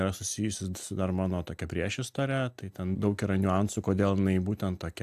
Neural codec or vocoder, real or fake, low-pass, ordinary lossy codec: none; real; 14.4 kHz; AAC, 96 kbps